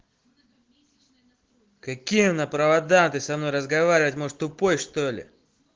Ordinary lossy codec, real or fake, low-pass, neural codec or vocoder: Opus, 16 kbps; real; 7.2 kHz; none